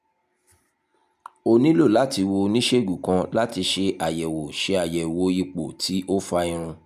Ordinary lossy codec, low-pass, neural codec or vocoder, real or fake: none; 14.4 kHz; none; real